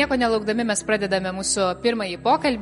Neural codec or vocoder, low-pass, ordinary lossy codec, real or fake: none; 19.8 kHz; MP3, 48 kbps; real